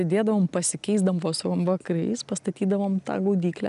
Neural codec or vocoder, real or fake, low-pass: none; real; 10.8 kHz